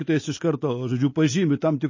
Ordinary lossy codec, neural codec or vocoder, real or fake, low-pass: MP3, 32 kbps; none; real; 7.2 kHz